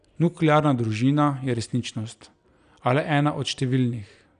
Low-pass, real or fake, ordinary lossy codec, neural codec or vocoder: 9.9 kHz; real; none; none